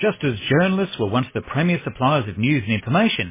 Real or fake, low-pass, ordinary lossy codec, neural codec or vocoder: real; 3.6 kHz; MP3, 16 kbps; none